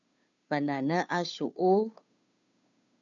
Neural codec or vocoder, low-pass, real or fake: codec, 16 kHz, 2 kbps, FunCodec, trained on Chinese and English, 25 frames a second; 7.2 kHz; fake